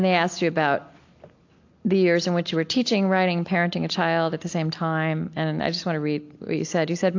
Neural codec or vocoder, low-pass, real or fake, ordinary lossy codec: none; 7.2 kHz; real; AAC, 48 kbps